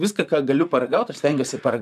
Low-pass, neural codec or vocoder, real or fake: 14.4 kHz; vocoder, 44.1 kHz, 128 mel bands, Pupu-Vocoder; fake